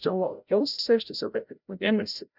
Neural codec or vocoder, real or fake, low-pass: codec, 16 kHz, 0.5 kbps, FreqCodec, larger model; fake; 5.4 kHz